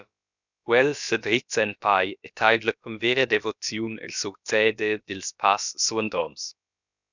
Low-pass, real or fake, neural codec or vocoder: 7.2 kHz; fake; codec, 16 kHz, about 1 kbps, DyCAST, with the encoder's durations